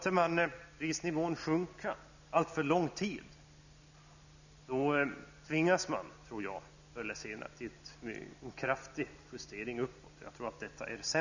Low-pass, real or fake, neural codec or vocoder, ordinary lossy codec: 7.2 kHz; real; none; none